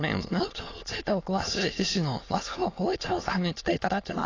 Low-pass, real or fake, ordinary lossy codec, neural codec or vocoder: 7.2 kHz; fake; AAC, 32 kbps; autoencoder, 22.05 kHz, a latent of 192 numbers a frame, VITS, trained on many speakers